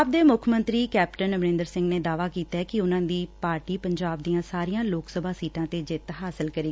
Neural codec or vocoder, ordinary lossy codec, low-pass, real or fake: none; none; none; real